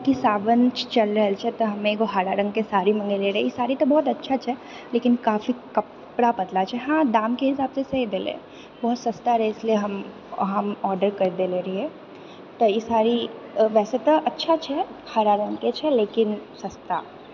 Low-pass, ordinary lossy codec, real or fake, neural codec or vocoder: 7.2 kHz; none; real; none